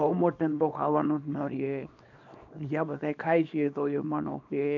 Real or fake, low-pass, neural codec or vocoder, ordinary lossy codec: fake; 7.2 kHz; codec, 24 kHz, 0.9 kbps, WavTokenizer, small release; none